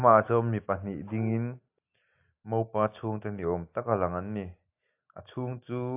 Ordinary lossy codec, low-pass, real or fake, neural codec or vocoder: AAC, 32 kbps; 3.6 kHz; real; none